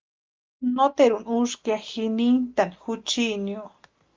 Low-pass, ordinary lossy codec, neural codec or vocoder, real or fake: 7.2 kHz; Opus, 32 kbps; none; real